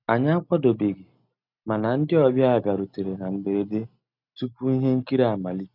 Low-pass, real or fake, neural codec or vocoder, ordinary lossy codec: 5.4 kHz; real; none; none